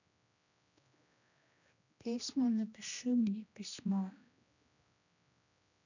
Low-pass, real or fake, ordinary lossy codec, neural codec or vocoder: 7.2 kHz; fake; none; codec, 16 kHz, 1 kbps, X-Codec, HuBERT features, trained on general audio